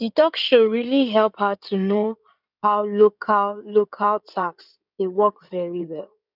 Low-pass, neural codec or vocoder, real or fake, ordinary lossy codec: 5.4 kHz; codec, 16 kHz in and 24 kHz out, 2.2 kbps, FireRedTTS-2 codec; fake; none